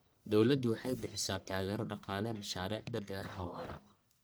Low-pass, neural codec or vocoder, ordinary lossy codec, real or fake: none; codec, 44.1 kHz, 1.7 kbps, Pupu-Codec; none; fake